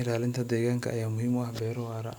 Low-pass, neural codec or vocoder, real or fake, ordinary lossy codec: none; none; real; none